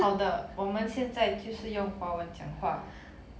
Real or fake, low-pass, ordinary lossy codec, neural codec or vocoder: real; none; none; none